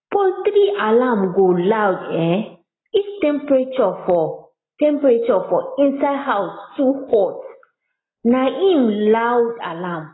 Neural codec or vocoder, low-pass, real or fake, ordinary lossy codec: none; 7.2 kHz; real; AAC, 16 kbps